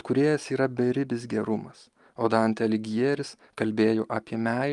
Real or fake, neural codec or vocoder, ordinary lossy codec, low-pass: real; none; Opus, 32 kbps; 10.8 kHz